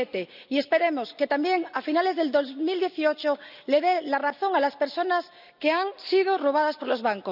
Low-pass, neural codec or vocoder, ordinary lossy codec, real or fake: 5.4 kHz; none; none; real